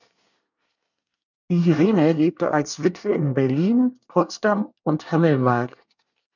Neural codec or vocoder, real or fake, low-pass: codec, 24 kHz, 1 kbps, SNAC; fake; 7.2 kHz